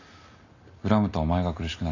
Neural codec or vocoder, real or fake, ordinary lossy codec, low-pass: none; real; none; 7.2 kHz